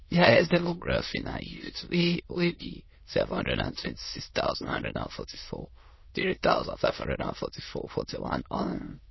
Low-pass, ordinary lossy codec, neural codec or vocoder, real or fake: 7.2 kHz; MP3, 24 kbps; autoencoder, 22.05 kHz, a latent of 192 numbers a frame, VITS, trained on many speakers; fake